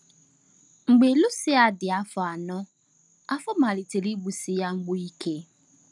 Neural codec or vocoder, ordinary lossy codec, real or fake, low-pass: none; none; real; none